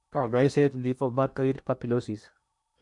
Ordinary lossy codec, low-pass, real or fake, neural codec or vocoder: none; 10.8 kHz; fake; codec, 16 kHz in and 24 kHz out, 0.8 kbps, FocalCodec, streaming, 65536 codes